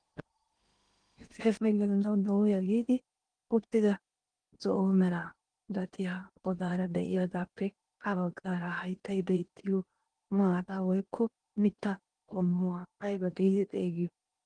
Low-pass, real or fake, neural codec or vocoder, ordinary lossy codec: 9.9 kHz; fake; codec, 16 kHz in and 24 kHz out, 0.8 kbps, FocalCodec, streaming, 65536 codes; Opus, 24 kbps